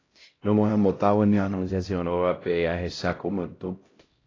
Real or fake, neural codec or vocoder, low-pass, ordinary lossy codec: fake; codec, 16 kHz, 0.5 kbps, X-Codec, HuBERT features, trained on LibriSpeech; 7.2 kHz; AAC, 32 kbps